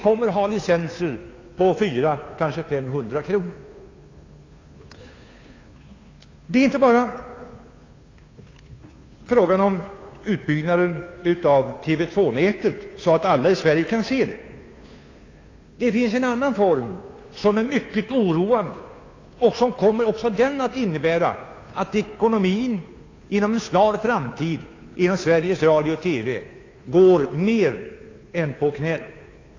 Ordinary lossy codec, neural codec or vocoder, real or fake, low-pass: AAC, 32 kbps; codec, 16 kHz, 2 kbps, FunCodec, trained on Chinese and English, 25 frames a second; fake; 7.2 kHz